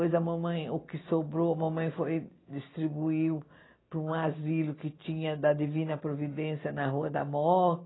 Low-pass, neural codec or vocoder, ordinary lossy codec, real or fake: 7.2 kHz; none; AAC, 16 kbps; real